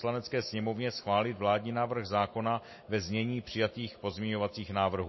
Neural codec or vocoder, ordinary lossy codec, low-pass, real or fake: none; MP3, 24 kbps; 7.2 kHz; real